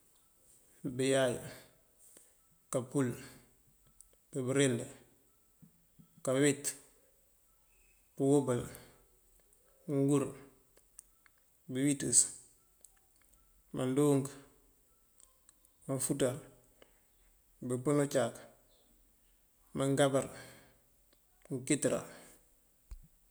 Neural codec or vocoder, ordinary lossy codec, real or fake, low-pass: none; none; real; none